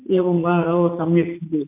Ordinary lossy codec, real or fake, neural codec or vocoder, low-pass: MP3, 24 kbps; fake; vocoder, 44.1 kHz, 80 mel bands, Vocos; 3.6 kHz